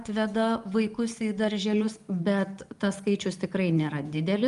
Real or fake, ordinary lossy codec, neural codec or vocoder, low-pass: real; Opus, 32 kbps; none; 10.8 kHz